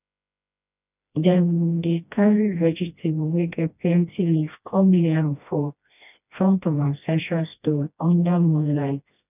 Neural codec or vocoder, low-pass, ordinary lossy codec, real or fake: codec, 16 kHz, 1 kbps, FreqCodec, smaller model; 3.6 kHz; none; fake